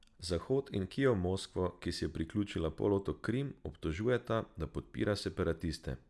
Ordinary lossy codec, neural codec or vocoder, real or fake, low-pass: none; none; real; none